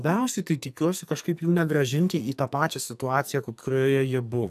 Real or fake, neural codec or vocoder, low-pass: fake; codec, 44.1 kHz, 2.6 kbps, SNAC; 14.4 kHz